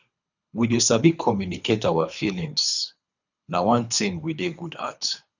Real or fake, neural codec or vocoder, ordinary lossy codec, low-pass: fake; codec, 24 kHz, 3 kbps, HILCodec; none; 7.2 kHz